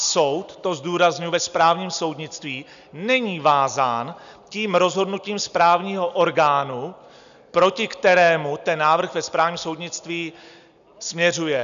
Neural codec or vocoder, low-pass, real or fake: none; 7.2 kHz; real